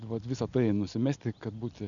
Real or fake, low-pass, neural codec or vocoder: real; 7.2 kHz; none